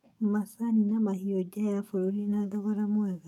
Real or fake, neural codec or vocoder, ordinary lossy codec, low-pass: fake; codec, 44.1 kHz, 7.8 kbps, DAC; none; 19.8 kHz